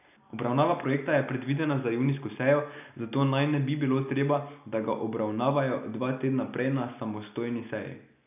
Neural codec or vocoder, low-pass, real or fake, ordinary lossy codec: none; 3.6 kHz; real; none